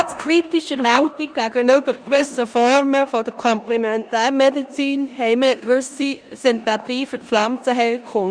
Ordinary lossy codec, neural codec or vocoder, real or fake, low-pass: Opus, 64 kbps; codec, 16 kHz in and 24 kHz out, 0.9 kbps, LongCat-Audio-Codec, four codebook decoder; fake; 9.9 kHz